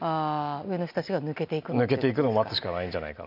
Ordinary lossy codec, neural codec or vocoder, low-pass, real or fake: none; none; 5.4 kHz; real